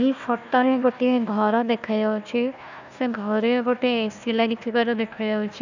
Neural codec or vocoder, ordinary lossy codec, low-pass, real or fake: codec, 16 kHz, 1 kbps, FunCodec, trained on Chinese and English, 50 frames a second; none; 7.2 kHz; fake